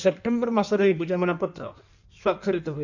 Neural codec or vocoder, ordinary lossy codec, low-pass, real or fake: codec, 16 kHz in and 24 kHz out, 1.1 kbps, FireRedTTS-2 codec; none; 7.2 kHz; fake